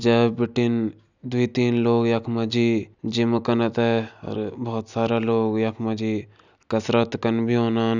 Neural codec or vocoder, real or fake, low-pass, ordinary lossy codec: none; real; 7.2 kHz; none